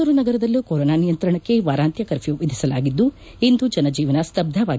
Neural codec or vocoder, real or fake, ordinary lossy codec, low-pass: none; real; none; none